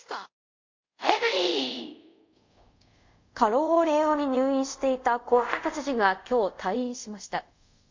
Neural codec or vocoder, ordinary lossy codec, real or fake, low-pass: codec, 24 kHz, 0.5 kbps, DualCodec; MP3, 48 kbps; fake; 7.2 kHz